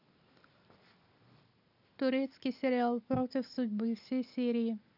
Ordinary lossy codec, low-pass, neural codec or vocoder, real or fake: none; 5.4 kHz; none; real